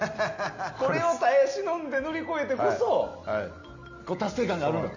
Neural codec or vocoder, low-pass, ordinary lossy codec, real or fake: none; 7.2 kHz; MP3, 64 kbps; real